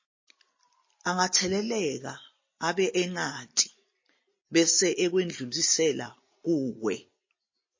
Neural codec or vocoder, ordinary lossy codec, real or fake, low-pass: none; MP3, 32 kbps; real; 7.2 kHz